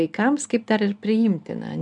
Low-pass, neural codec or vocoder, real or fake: 10.8 kHz; none; real